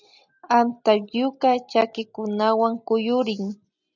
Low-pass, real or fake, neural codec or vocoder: 7.2 kHz; real; none